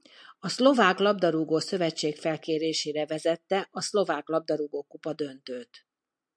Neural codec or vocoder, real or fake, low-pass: none; real; 9.9 kHz